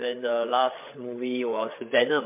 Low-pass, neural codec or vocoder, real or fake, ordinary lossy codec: 3.6 kHz; codec, 16 kHz, 8 kbps, FreqCodec, larger model; fake; MP3, 32 kbps